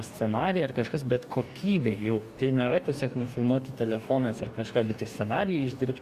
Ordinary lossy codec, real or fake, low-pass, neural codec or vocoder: Opus, 64 kbps; fake; 14.4 kHz; codec, 44.1 kHz, 2.6 kbps, DAC